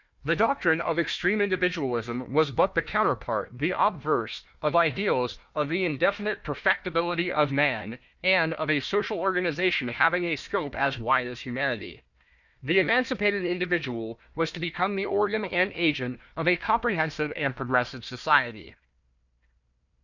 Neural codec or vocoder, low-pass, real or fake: codec, 16 kHz, 1 kbps, FunCodec, trained on Chinese and English, 50 frames a second; 7.2 kHz; fake